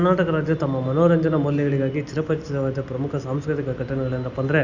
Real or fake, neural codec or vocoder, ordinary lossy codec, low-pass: real; none; Opus, 64 kbps; 7.2 kHz